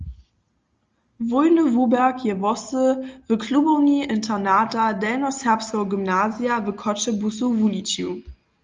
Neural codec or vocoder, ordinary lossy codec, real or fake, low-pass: none; Opus, 32 kbps; real; 7.2 kHz